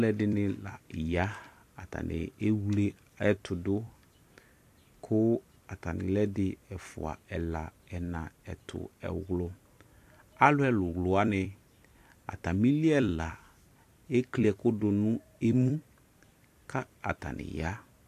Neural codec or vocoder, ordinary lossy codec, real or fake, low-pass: vocoder, 44.1 kHz, 128 mel bands every 256 samples, BigVGAN v2; AAC, 64 kbps; fake; 14.4 kHz